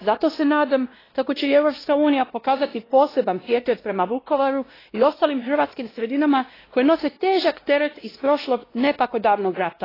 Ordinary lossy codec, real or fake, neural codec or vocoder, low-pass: AAC, 24 kbps; fake; codec, 16 kHz, 1 kbps, X-Codec, WavLM features, trained on Multilingual LibriSpeech; 5.4 kHz